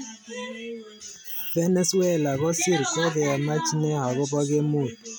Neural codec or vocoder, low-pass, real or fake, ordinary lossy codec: none; none; real; none